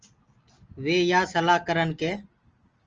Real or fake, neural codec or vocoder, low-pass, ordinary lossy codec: real; none; 7.2 kHz; Opus, 24 kbps